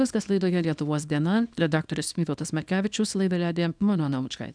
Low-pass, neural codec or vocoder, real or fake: 9.9 kHz; codec, 24 kHz, 0.9 kbps, WavTokenizer, small release; fake